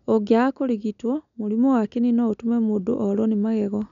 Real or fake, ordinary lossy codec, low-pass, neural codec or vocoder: real; none; 7.2 kHz; none